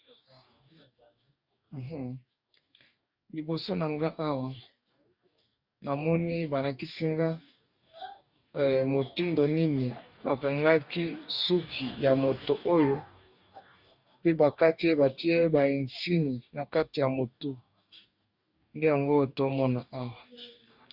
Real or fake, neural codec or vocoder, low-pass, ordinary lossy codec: fake; codec, 44.1 kHz, 2.6 kbps, DAC; 5.4 kHz; Opus, 64 kbps